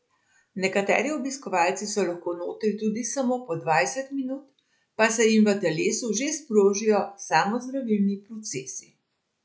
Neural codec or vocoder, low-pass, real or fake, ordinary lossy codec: none; none; real; none